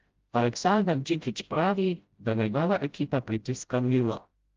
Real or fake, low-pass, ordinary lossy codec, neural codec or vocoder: fake; 7.2 kHz; Opus, 32 kbps; codec, 16 kHz, 0.5 kbps, FreqCodec, smaller model